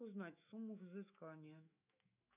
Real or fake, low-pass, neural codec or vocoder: fake; 3.6 kHz; autoencoder, 48 kHz, 128 numbers a frame, DAC-VAE, trained on Japanese speech